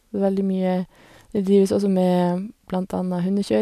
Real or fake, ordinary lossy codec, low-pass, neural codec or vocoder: real; none; 14.4 kHz; none